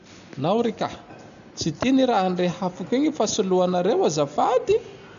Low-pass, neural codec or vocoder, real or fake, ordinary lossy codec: 7.2 kHz; none; real; none